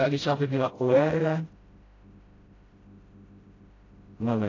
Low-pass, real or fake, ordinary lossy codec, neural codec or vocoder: 7.2 kHz; fake; AAC, 32 kbps; codec, 16 kHz, 0.5 kbps, FreqCodec, smaller model